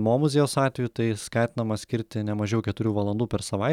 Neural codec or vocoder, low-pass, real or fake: none; 19.8 kHz; real